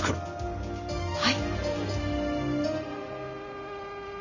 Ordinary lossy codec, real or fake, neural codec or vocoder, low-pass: none; real; none; 7.2 kHz